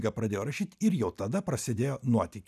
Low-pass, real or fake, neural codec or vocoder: 14.4 kHz; real; none